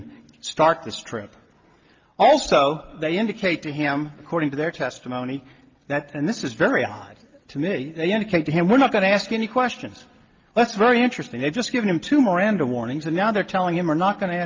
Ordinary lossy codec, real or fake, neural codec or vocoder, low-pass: Opus, 32 kbps; real; none; 7.2 kHz